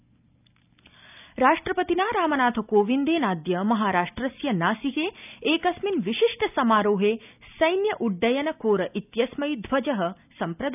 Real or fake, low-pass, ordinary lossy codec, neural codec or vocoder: real; 3.6 kHz; none; none